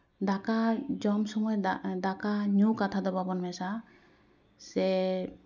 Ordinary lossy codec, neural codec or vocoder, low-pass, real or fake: none; none; 7.2 kHz; real